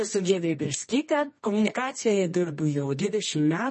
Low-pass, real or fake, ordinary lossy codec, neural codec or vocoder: 10.8 kHz; fake; MP3, 32 kbps; codec, 24 kHz, 0.9 kbps, WavTokenizer, medium music audio release